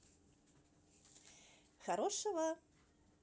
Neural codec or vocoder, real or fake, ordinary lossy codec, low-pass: none; real; none; none